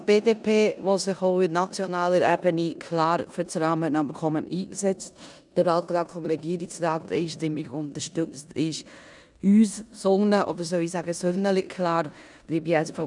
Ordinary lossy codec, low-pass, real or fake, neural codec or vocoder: none; 10.8 kHz; fake; codec, 16 kHz in and 24 kHz out, 0.9 kbps, LongCat-Audio-Codec, four codebook decoder